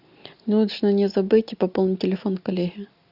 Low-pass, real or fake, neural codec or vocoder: 5.4 kHz; real; none